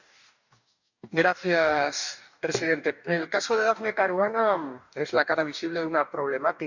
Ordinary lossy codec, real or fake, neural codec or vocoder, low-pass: none; fake; codec, 44.1 kHz, 2.6 kbps, DAC; 7.2 kHz